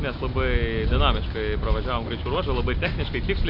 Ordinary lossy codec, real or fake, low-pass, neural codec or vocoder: Opus, 64 kbps; real; 5.4 kHz; none